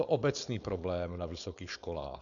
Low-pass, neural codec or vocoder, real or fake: 7.2 kHz; codec, 16 kHz, 4.8 kbps, FACodec; fake